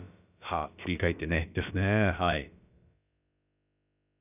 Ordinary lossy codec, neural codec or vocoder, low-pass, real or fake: none; codec, 16 kHz, about 1 kbps, DyCAST, with the encoder's durations; 3.6 kHz; fake